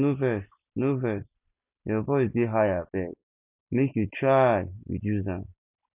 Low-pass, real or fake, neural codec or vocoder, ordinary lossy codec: 3.6 kHz; real; none; none